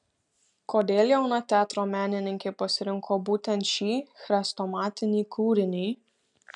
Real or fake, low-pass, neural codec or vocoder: real; 10.8 kHz; none